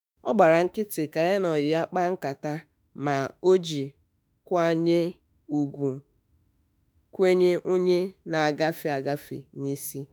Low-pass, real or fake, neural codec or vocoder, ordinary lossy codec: none; fake; autoencoder, 48 kHz, 32 numbers a frame, DAC-VAE, trained on Japanese speech; none